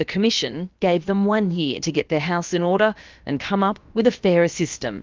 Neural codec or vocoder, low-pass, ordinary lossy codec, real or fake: codec, 16 kHz, about 1 kbps, DyCAST, with the encoder's durations; 7.2 kHz; Opus, 24 kbps; fake